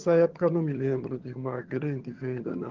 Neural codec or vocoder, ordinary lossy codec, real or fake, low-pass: vocoder, 22.05 kHz, 80 mel bands, HiFi-GAN; Opus, 16 kbps; fake; 7.2 kHz